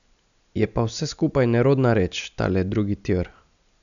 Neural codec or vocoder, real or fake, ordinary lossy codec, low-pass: none; real; none; 7.2 kHz